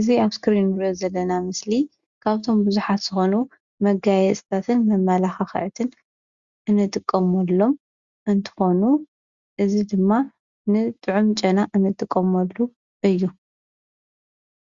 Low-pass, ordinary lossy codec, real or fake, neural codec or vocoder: 7.2 kHz; Opus, 64 kbps; real; none